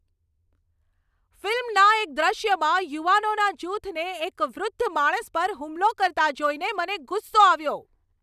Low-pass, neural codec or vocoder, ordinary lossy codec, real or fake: 14.4 kHz; none; none; real